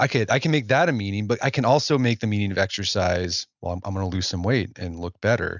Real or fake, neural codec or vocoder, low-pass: real; none; 7.2 kHz